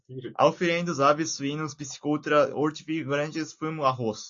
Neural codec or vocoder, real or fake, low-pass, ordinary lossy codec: none; real; 7.2 kHz; AAC, 48 kbps